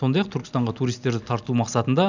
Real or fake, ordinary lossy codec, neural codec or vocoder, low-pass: real; none; none; 7.2 kHz